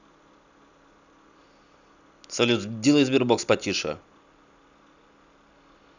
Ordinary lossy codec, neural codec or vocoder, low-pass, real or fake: none; none; 7.2 kHz; real